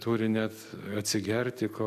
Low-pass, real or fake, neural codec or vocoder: 14.4 kHz; real; none